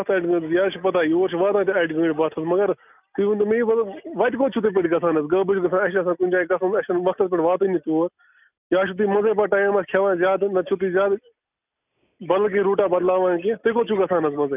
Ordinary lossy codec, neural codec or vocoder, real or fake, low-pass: none; none; real; 3.6 kHz